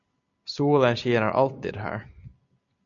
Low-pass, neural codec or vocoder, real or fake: 7.2 kHz; none; real